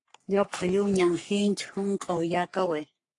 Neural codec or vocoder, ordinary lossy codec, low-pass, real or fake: codec, 44.1 kHz, 3.4 kbps, Pupu-Codec; AAC, 48 kbps; 10.8 kHz; fake